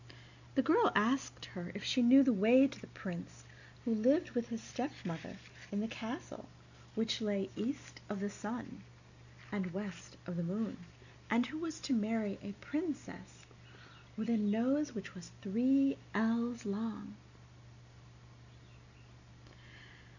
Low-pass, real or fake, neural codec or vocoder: 7.2 kHz; real; none